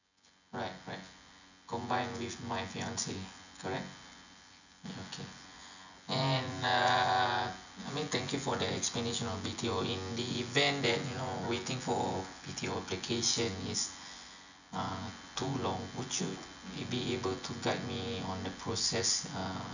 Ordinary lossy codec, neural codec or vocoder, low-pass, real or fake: none; vocoder, 24 kHz, 100 mel bands, Vocos; 7.2 kHz; fake